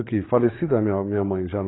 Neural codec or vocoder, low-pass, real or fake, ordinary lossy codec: codec, 16 kHz, 8 kbps, FreqCodec, larger model; 7.2 kHz; fake; AAC, 16 kbps